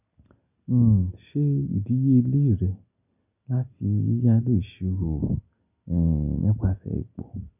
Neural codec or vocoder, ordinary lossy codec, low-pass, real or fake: none; none; 3.6 kHz; real